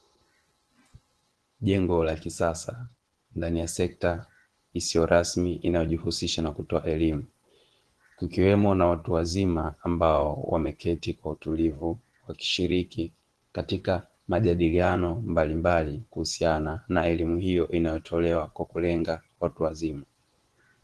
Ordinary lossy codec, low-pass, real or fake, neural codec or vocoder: Opus, 16 kbps; 10.8 kHz; fake; vocoder, 24 kHz, 100 mel bands, Vocos